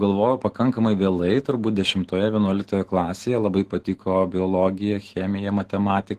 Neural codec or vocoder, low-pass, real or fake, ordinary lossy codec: autoencoder, 48 kHz, 128 numbers a frame, DAC-VAE, trained on Japanese speech; 14.4 kHz; fake; Opus, 16 kbps